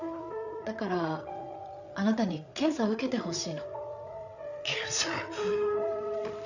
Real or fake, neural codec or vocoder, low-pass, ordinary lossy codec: fake; codec, 16 kHz, 8 kbps, FreqCodec, larger model; 7.2 kHz; none